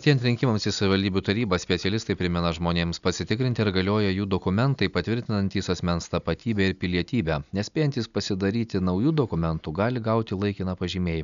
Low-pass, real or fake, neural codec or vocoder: 7.2 kHz; real; none